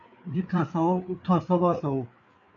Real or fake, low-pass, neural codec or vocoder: fake; 7.2 kHz; codec, 16 kHz, 4 kbps, FreqCodec, larger model